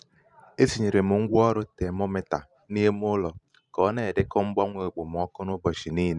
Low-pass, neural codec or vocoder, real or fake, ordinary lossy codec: 10.8 kHz; vocoder, 44.1 kHz, 128 mel bands every 512 samples, BigVGAN v2; fake; none